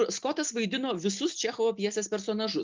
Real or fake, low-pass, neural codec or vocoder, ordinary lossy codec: real; 7.2 kHz; none; Opus, 32 kbps